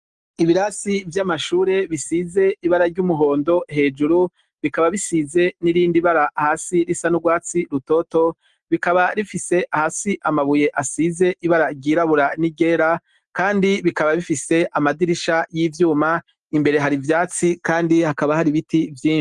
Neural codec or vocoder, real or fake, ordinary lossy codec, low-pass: none; real; Opus, 24 kbps; 10.8 kHz